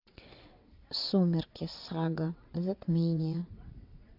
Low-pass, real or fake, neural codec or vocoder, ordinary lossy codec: 5.4 kHz; fake; vocoder, 22.05 kHz, 80 mel bands, WaveNeXt; AAC, 48 kbps